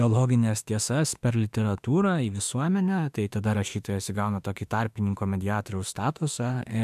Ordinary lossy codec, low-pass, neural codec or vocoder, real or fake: AAC, 96 kbps; 14.4 kHz; autoencoder, 48 kHz, 32 numbers a frame, DAC-VAE, trained on Japanese speech; fake